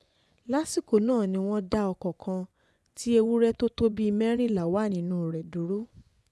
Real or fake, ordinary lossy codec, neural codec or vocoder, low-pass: fake; none; vocoder, 24 kHz, 100 mel bands, Vocos; none